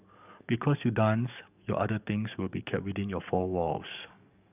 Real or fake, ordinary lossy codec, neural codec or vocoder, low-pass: fake; none; codec, 44.1 kHz, 7.8 kbps, DAC; 3.6 kHz